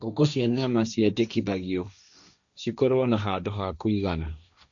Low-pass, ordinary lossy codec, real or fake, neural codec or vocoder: 7.2 kHz; none; fake; codec, 16 kHz, 1.1 kbps, Voila-Tokenizer